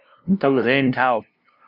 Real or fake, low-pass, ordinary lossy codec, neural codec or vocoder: fake; 5.4 kHz; Opus, 64 kbps; codec, 16 kHz, 0.5 kbps, FunCodec, trained on LibriTTS, 25 frames a second